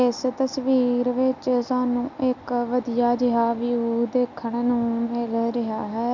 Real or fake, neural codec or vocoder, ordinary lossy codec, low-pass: real; none; none; 7.2 kHz